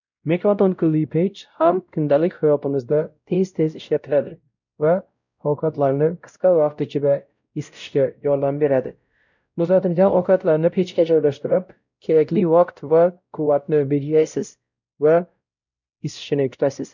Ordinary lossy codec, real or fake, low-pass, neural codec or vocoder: none; fake; 7.2 kHz; codec, 16 kHz, 0.5 kbps, X-Codec, WavLM features, trained on Multilingual LibriSpeech